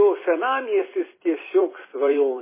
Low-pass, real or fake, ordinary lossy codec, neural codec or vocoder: 3.6 kHz; fake; MP3, 16 kbps; vocoder, 44.1 kHz, 128 mel bands every 256 samples, BigVGAN v2